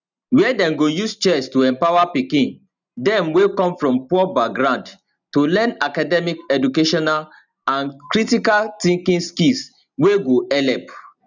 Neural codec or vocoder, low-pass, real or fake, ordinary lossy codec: none; 7.2 kHz; real; none